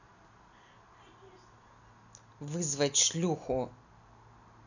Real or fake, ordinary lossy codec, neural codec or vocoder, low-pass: real; none; none; 7.2 kHz